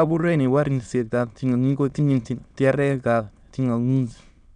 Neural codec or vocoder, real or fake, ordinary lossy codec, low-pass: autoencoder, 22.05 kHz, a latent of 192 numbers a frame, VITS, trained on many speakers; fake; none; 9.9 kHz